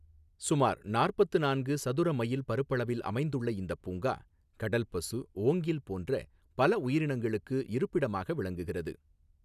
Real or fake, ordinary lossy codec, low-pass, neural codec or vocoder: real; none; 14.4 kHz; none